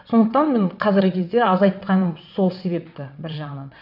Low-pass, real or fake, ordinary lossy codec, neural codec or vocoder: 5.4 kHz; fake; none; vocoder, 22.05 kHz, 80 mel bands, WaveNeXt